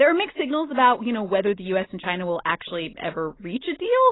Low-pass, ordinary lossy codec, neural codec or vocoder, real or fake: 7.2 kHz; AAC, 16 kbps; none; real